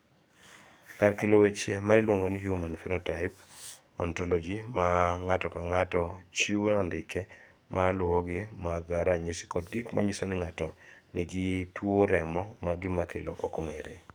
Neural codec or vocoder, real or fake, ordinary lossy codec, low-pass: codec, 44.1 kHz, 2.6 kbps, SNAC; fake; none; none